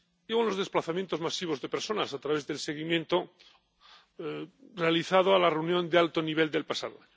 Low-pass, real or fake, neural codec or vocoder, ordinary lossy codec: none; real; none; none